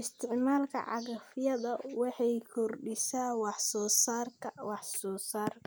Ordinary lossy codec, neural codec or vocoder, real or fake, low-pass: none; none; real; none